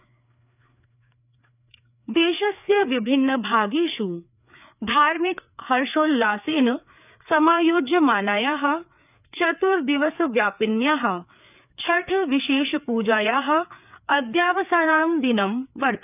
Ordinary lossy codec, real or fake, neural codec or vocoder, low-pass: none; fake; codec, 16 kHz, 4 kbps, FreqCodec, larger model; 3.6 kHz